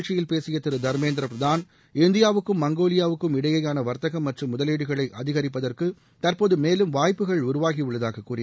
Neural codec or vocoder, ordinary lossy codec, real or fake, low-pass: none; none; real; none